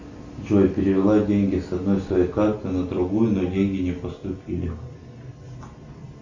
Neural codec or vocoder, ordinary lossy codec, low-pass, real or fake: none; Opus, 64 kbps; 7.2 kHz; real